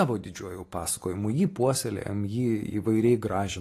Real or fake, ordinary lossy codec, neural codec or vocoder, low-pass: real; AAC, 48 kbps; none; 14.4 kHz